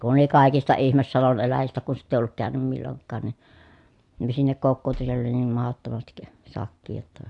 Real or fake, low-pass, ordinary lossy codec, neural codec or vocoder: real; 10.8 kHz; AAC, 64 kbps; none